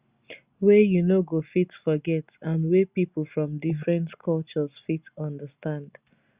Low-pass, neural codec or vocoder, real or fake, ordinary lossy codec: 3.6 kHz; none; real; Opus, 64 kbps